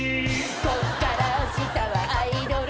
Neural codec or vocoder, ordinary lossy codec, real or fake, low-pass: none; none; real; none